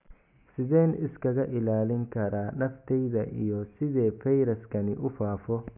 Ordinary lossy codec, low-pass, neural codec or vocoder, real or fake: none; 3.6 kHz; none; real